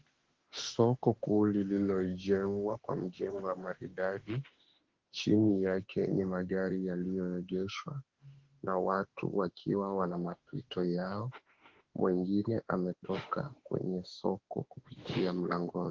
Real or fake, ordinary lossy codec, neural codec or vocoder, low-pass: fake; Opus, 16 kbps; autoencoder, 48 kHz, 32 numbers a frame, DAC-VAE, trained on Japanese speech; 7.2 kHz